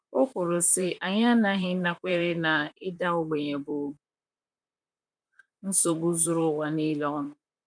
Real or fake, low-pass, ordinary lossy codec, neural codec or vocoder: fake; 9.9 kHz; none; vocoder, 44.1 kHz, 128 mel bands, Pupu-Vocoder